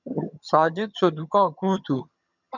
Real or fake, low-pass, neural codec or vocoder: fake; 7.2 kHz; vocoder, 22.05 kHz, 80 mel bands, HiFi-GAN